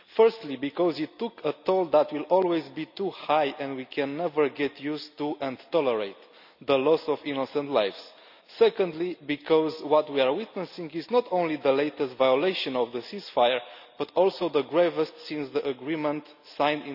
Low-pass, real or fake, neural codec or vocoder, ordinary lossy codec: 5.4 kHz; real; none; none